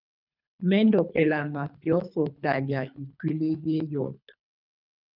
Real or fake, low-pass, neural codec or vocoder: fake; 5.4 kHz; codec, 24 kHz, 3 kbps, HILCodec